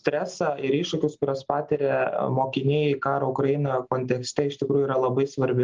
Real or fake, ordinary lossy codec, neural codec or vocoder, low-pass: real; Opus, 24 kbps; none; 7.2 kHz